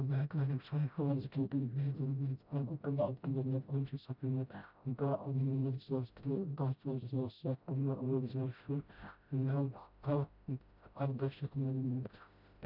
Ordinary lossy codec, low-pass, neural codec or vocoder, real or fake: none; 5.4 kHz; codec, 16 kHz, 0.5 kbps, FreqCodec, smaller model; fake